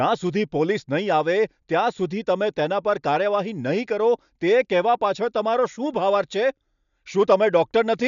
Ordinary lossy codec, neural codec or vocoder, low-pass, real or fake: none; none; 7.2 kHz; real